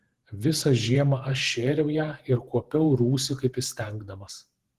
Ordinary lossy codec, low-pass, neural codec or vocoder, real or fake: Opus, 16 kbps; 14.4 kHz; vocoder, 48 kHz, 128 mel bands, Vocos; fake